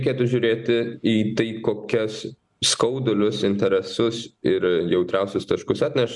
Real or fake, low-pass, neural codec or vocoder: real; 10.8 kHz; none